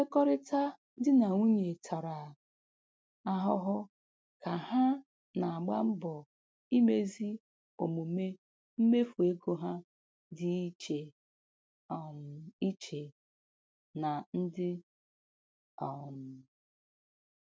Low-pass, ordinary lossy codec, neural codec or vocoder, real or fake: none; none; none; real